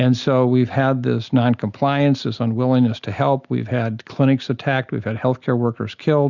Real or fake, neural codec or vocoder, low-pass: real; none; 7.2 kHz